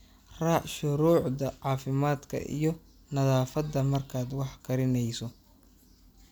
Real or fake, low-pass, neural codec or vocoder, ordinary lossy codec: real; none; none; none